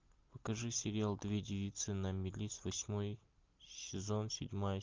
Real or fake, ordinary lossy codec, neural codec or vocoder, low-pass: real; Opus, 24 kbps; none; 7.2 kHz